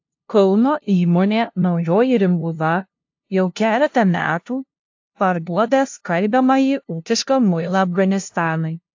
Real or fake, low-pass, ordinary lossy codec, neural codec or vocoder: fake; 7.2 kHz; AAC, 48 kbps; codec, 16 kHz, 0.5 kbps, FunCodec, trained on LibriTTS, 25 frames a second